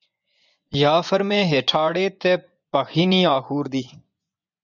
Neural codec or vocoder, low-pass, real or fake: vocoder, 24 kHz, 100 mel bands, Vocos; 7.2 kHz; fake